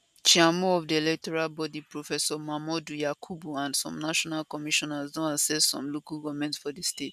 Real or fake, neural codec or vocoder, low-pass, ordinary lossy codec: real; none; 14.4 kHz; none